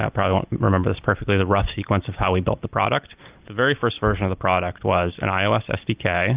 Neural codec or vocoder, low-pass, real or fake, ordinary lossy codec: none; 3.6 kHz; real; Opus, 32 kbps